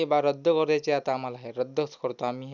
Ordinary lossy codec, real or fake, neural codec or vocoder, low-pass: none; real; none; 7.2 kHz